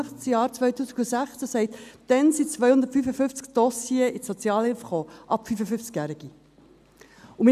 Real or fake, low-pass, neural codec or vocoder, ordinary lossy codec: real; 14.4 kHz; none; none